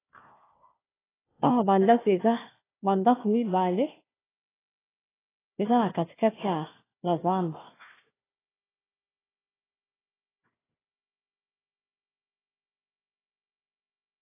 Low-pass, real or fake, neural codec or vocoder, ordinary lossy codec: 3.6 kHz; fake; codec, 16 kHz, 1 kbps, FunCodec, trained on Chinese and English, 50 frames a second; AAC, 16 kbps